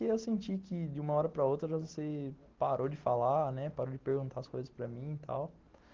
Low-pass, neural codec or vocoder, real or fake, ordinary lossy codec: 7.2 kHz; none; real; Opus, 16 kbps